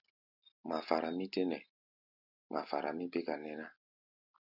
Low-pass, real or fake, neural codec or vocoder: 5.4 kHz; real; none